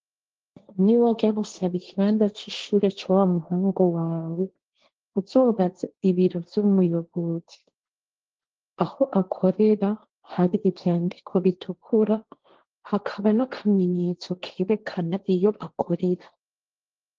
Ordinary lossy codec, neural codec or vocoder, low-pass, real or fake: Opus, 16 kbps; codec, 16 kHz, 1.1 kbps, Voila-Tokenizer; 7.2 kHz; fake